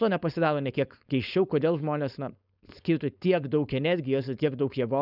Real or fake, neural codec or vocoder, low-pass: fake; codec, 16 kHz, 4.8 kbps, FACodec; 5.4 kHz